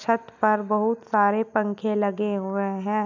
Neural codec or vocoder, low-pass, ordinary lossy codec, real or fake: none; 7.2 kHz; none; real